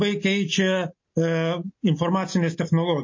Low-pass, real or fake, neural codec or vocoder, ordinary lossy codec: 7.2 kHz; real; none; MP3, 32 kbps